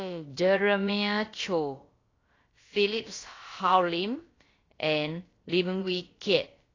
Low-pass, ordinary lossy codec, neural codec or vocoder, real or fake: 7.2 kHz; AAC, 32 kbps; codec, 16 kHz, about 1 kbps, DyCAST, with the encoder's durations; fake